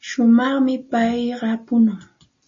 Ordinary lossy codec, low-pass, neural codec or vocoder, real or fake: MP3, 32 kbps; 7.2 kHz; none; real